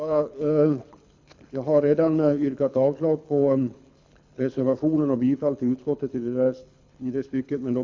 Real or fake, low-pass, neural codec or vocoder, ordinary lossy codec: fake; 7.2 kHz; codec, 24 kHz, 6 kbps, HILCodec; MP3, 64 kbps